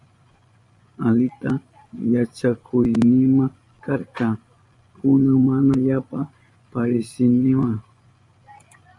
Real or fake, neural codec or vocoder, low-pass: fake; vocoder, 24 kHz, 100 mel bands, Vocos; 10.8 kHz